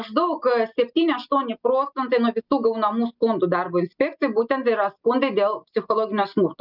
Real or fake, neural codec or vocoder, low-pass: real; none; 5.4 kHz